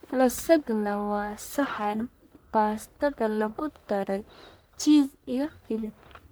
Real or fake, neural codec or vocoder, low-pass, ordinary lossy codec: fake; codec, 44.1 kHz, 1.7 kbps, Pupu-Codec; none; none